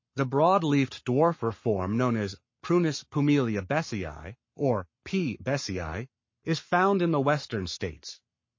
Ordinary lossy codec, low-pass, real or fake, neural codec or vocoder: MP3, 32 kbps; 7.2 kHz; fake; codec, 44.1 kHz, 7.8 kbps, Pupu-Codec